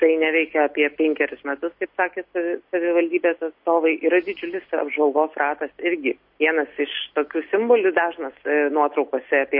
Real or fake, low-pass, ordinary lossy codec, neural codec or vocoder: real; 5.4 kHz; MP3, 32 kbps; none